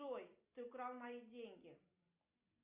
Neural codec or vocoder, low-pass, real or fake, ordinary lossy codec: none; 3.6 kHz; real; Opus, 64 kbps